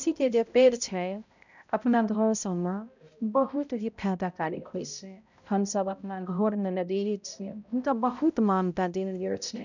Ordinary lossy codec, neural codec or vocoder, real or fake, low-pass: none; codec, 16 kHz, 0.5 kbps, X-Codec, HuBERT features, trained on balanced general audio; fake; 7.2 kHz